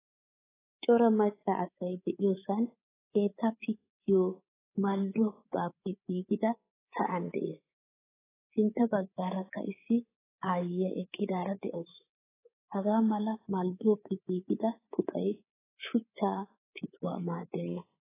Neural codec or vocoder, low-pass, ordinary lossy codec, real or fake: codec, 24 kHz, 3.1 kbps, DualCodec; 3.6 kHz; AAC, 16 kbps; fake